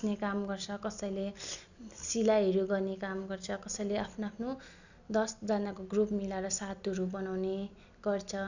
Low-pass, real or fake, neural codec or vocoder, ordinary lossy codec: 7.2 kHz; real; none; none